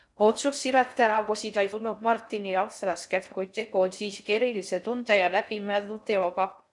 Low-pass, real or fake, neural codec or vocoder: 10.8 kHz; fake; codec, 16 kHz in and 24 kHz out, 0.6 kbps, FocalCodec, streaming, 4096 codes